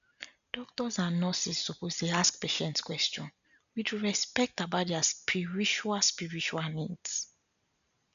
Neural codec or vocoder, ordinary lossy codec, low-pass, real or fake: none; none; 7.2 kHz; real